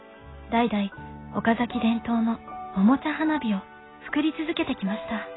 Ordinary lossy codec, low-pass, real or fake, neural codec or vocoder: AAC, 16 kbps; 7.2 kHz; real; none